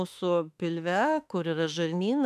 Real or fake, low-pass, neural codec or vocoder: fake; 14.4 kHz; autoencoder, 48 kHz, 32 numbers a frame, DAC-VAE, trained on Japanese speech